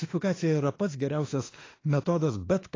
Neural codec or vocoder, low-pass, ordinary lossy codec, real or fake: autoencoder, 48 kHz, 32 numbers a frame, DAC-VAE, trained on Japanese speech; 7.2 kHz; AAC, 32 kbps; fake